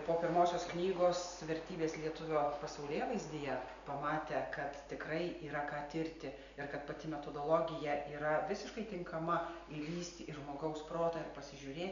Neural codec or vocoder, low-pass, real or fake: none; 7.2 kHz; real